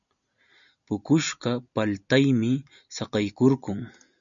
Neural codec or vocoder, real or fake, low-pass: none; real; 7.2 kHz